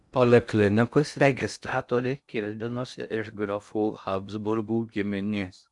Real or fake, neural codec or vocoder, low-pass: fake; codec, 16 kHz in and 24 kHz out, 0.6 kbps, FocalCodec, streaming, 2048 codes; 10.8 kHz